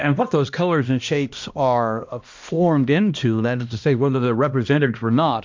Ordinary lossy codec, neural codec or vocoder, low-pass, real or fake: MP3, 64 kbps; codec, 16 kHz, 1 kbps, X-Codec, HuBERT features, trained on balanced general audio; 7.2 kHz; fake